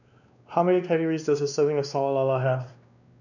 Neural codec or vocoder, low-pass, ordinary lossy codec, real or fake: codec, 16 kHz, 2 kbps, X-Codec, WavLM features, trained on Multilingual LibriSpeech; 7.2 kHz; none; fake